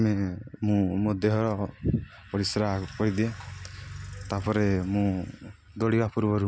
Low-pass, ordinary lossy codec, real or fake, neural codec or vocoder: none; none; real; none